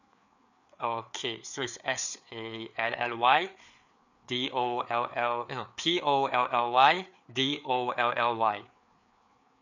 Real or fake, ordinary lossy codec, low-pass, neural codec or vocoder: fake; none; 7.2 kHz; codec, 16 kHz, 4 kbps, FreqCodec, larger model